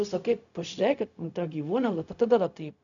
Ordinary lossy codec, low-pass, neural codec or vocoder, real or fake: MP3, 96 kbps; 7.2 kHz; codec, 16 kHz, 0.4 kbps, LongCat-Audio-Codec; fake